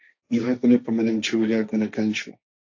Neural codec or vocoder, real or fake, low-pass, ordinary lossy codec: codec, 16 kHz, 1.1 kbps, Voila-Tokenizer; fake; 7.2 kHz; AAC, 48 kbps